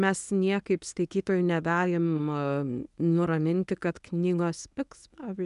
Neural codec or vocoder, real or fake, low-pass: codec, 24 kHz, 0.9 kbps, WavTokenizer, medium speech release version 1; fake; 10.8 kHz